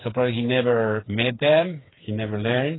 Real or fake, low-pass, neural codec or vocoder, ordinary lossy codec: fake; 7.2 kHz; codec, 16 kHz, 4 kbps, FreqCodec, smaller model; AAC, 16 kbps